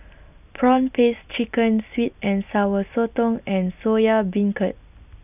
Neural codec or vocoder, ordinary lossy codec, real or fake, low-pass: none; none; real; 3.6 kHz